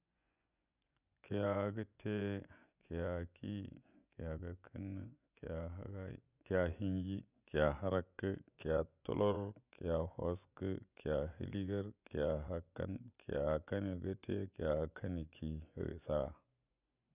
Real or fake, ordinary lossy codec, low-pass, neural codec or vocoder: fake; none; 3.6 kHz; vocoder, 24 kHz, 100 mel bands, Vocos